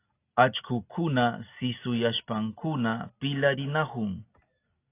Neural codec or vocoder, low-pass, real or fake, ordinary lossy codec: none; 3.6 kHz; real; AAC, 24 kbps